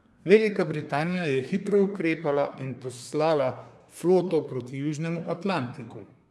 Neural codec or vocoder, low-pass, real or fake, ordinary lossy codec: codec, 24 kHz, 1 kbps, SNAC; none; fake; none